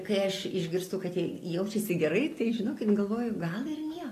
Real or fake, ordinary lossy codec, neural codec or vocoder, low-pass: real; AAC, 48 kbps; none; 14.4 kHz